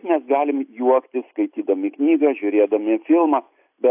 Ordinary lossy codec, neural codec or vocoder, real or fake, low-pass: AAC, 32 kbps; none; real; 3.6 kHz